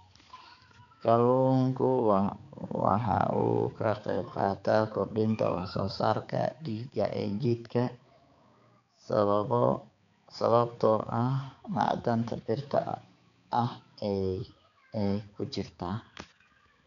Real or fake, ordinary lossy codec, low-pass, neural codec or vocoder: fake; none; 7.2 kHz; codec, 16 kHz, 4 kbps, X-Codec, HuBERT features, trained on balanced general audio